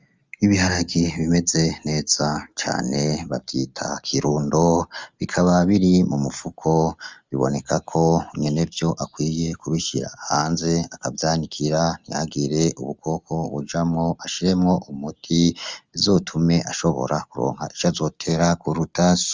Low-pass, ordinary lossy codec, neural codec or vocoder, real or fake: 7.2 kHz; Opus, 32 kbps; none; real